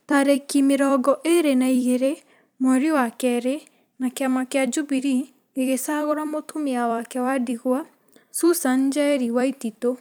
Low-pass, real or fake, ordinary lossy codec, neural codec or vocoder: none; fake; none; vocoder, 44.1 kHz, 128 mel bands every 256 samples, BigVGAN v2